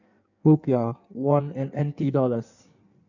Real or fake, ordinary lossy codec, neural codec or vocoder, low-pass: fake; none; codec, 16 kHz in and 24 kHz out, 1.1 kbps, FireRedTTS-2 codec; 7.2 kHz